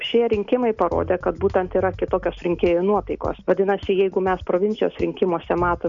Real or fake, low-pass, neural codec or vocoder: real; 7.2 kHz; none